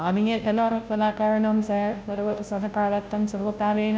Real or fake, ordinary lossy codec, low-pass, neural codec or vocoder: fake; none; none; codec, 16 kHz, 0.5 kbps, FunCodec, trained on Chinese and English, 25 frames a second